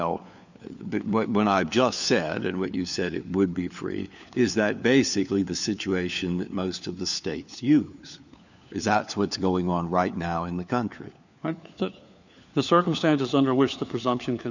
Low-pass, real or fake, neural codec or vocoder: 7.2 kHz; fake; codec, 16 kHz, 4 kbps, FunCodec, trained on LibriTTS, 50 frames a second